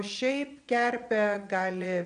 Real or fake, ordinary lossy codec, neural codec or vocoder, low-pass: fake; MP3, 96 kbps; vocoder, 22.05 kHz, 80 mel bands, Vocos; 9.9 kHz